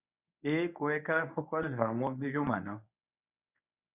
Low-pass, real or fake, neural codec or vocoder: 3.6 kHz; fake; codec, 24 kHz, 0.9 kbps, WavTokenizer, medium speech release version 1